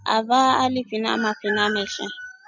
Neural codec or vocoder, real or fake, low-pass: none; real; 7.2 kHz